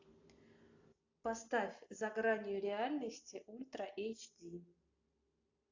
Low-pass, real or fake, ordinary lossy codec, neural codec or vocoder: 7.2 kHz; real; AAC, 48 kbps; none